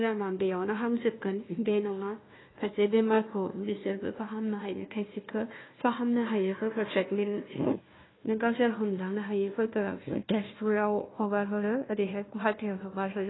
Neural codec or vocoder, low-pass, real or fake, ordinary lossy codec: codec, 16 kHz, 1 kbps, FunCodec, trained on Chinese and English, 50 frames a second; 7.2 kHz; fake; AAC, 16 kbps